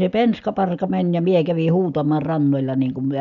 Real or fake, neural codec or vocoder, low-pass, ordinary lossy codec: real; none; 7.2 kHz; none